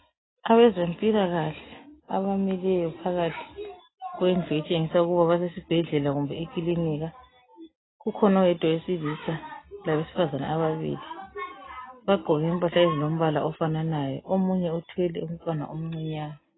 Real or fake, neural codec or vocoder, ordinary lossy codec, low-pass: real; none; AAC, 16 kbps; 7.2 kHz